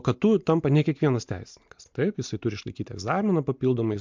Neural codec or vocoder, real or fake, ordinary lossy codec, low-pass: none; real; MP3, 64 kbps; 7.2 kHz